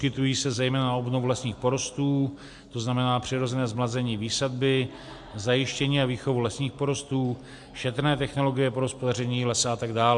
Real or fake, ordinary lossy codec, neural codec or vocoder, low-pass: real; MP3, 64 kbps; none; 10.8 kHz